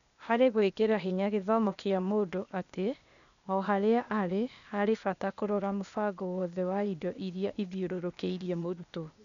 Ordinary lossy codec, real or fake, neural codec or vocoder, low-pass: none; fake; codec, 16 kHz, 0.8 kbps, ZipCodec; 7.2 kHz